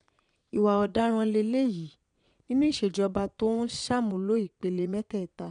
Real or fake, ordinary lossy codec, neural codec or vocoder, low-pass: fake; none; vocoder, 22.05 kHz, 80 mel bands, Vocos; 9.9 kHz